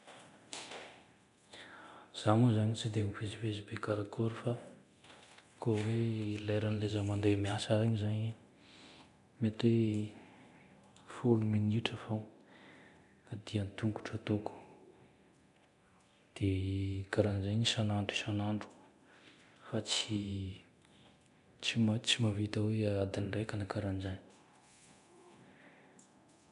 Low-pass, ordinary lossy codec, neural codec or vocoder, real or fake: 10.8 kHz; none; codec, 24 kHz, 0.9 kbps, DualCodec; fake